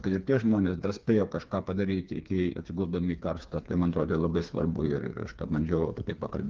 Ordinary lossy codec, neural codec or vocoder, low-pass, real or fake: Opus, 24 kbps; codec, 16 kHz, 4 kbps, FreqCodec, smaller model; 7.2 kHz; fake